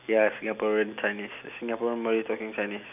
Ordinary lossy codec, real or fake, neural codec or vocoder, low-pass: none; real; none; 3.6 kHz